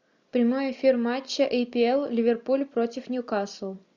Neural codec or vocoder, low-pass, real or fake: none; 7.2 kHz; real